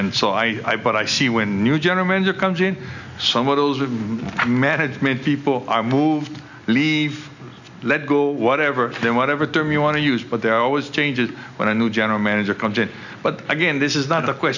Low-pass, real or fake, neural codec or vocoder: 7.2 kHz; real; none